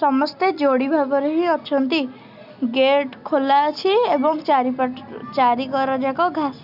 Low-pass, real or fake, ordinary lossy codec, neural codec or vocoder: 5.4 kHz; real; none; none